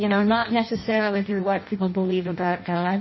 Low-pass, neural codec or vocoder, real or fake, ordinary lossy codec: 7.2 kHz; codec, 16 kHz in and 24 kHz out, 0.6 kbps, FireRedTTS-2 codec; fake; MP3, 24 kbps